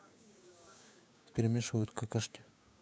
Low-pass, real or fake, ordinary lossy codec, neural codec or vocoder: none; fake; none; codec, 16 kHz, 6 kbps, DAC